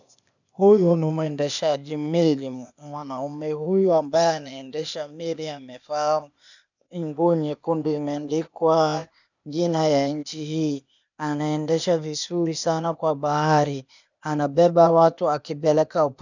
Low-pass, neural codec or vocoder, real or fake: 7.2 kHz; codec, 16 kHz, 0.8 kbps, ZipCodec; fake